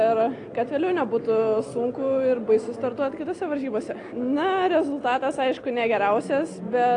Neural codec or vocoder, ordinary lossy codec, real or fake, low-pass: none; AAC, 48 kbps; real; 9.9 kHz